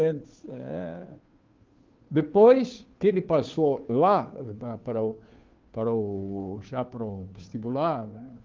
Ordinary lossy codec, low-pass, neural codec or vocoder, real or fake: Opus, 24 kbps; 7.2 kHz; codec, 16 kHz, 2 kbps, FunCodec, trained on Chinese and English, 25 frames a second; fake